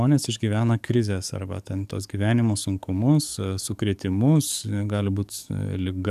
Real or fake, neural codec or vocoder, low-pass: fake; codec, 44.1 kHz, 7.8 kbps, DAC; 14.4 kHz